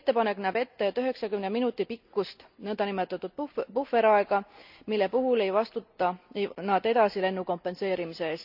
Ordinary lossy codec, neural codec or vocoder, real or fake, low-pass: none; none; real; 5.4 kHz